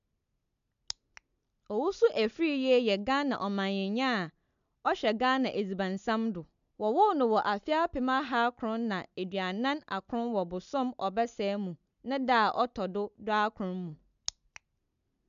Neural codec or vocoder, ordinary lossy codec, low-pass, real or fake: none; AAC, 96 kbps; 7.2 kHz; real